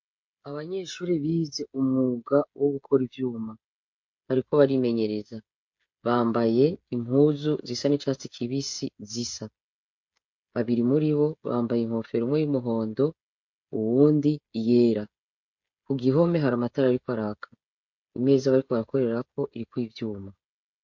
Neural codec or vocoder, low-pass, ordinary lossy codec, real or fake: codec, 16 kHz, 16 kbps, FreqCodec, smaller model; 7.2 kHz; MP3, 48 kbps; fake